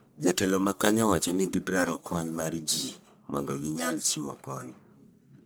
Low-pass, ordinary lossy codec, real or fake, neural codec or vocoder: none; none; fake; codec, 44.1 kHz, 1.7 kbps, Pupu-Codec